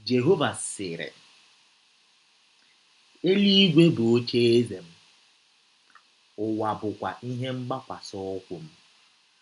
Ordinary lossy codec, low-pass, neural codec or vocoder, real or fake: none; 10.8 kHz; none; real